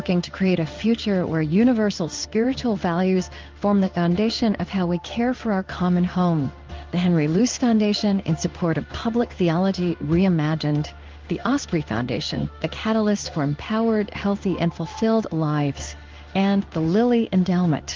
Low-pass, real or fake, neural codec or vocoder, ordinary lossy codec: 7.2 kHz; fake; codec, 16 kHz in and 24 kHz out, 1 kbps, XY-Tokenizer; Opus, 32 kbps